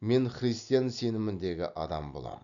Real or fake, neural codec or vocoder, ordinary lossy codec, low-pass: real; none; none; 7.2 kHz